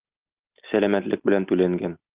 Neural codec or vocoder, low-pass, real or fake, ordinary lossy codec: none; 3.6 kHz; real; Opus, 32 kbps